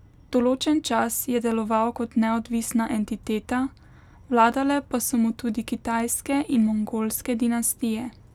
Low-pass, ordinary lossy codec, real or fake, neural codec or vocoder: 19.8 kHz; none; real; none